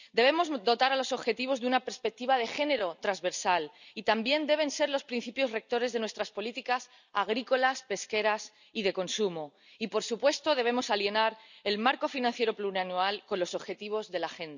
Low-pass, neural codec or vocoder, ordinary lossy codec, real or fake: 7.2 kHz; none; none; real